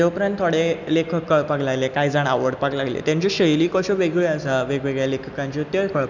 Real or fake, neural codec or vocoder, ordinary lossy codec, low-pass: real; none; none; 7.2 kHz